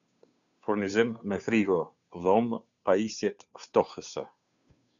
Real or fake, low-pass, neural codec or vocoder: fake; 7.2 kHz; codec, 16 kHz, 2 kbps, FunCodec, trained on Chinese and English, 25 frames a second